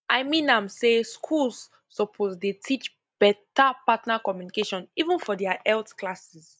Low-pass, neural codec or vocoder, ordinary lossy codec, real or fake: none; none; none; real